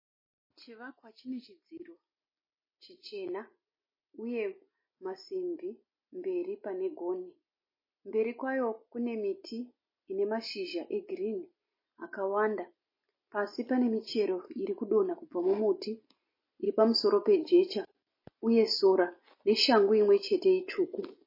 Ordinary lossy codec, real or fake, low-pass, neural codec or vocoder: MP3, 24 kbps; real; 5.4 kHz; none